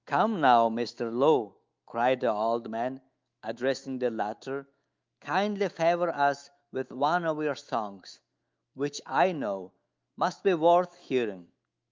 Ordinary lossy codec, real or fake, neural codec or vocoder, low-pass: Opus, 24 kbps; fake; autoencoder, 48 kHz, 128 numbers a frame, DAC-VAE, trained on Japanese speech; 7.2 kHz